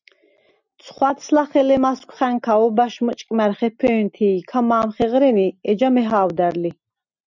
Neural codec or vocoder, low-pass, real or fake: none; 7.2 kHz; real